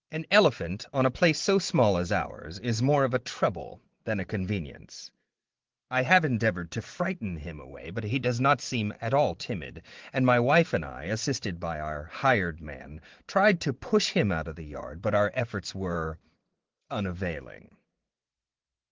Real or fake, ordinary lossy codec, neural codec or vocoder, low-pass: fake; Opus, 16 kbps; vocoder, 44.1 kHz, 128 mel bands every 512 samples, BigVGAN v2; 7.2 kHz